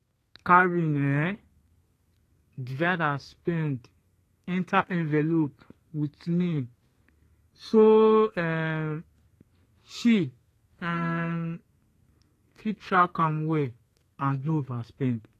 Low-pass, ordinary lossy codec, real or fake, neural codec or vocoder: 14.4 kHz; AAC, 48 kbps; fake; codec, 32 kHz, 1.9 kbps, SNAC